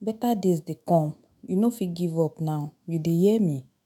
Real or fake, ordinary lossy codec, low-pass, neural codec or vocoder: fake; none; 19.8 kHz; autoencoder, 48 kHz, 128 numbers a frame, DAC-VAE, trained on Japanese speech